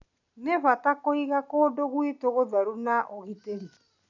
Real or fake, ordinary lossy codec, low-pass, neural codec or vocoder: real; none; 7.2 kHz; none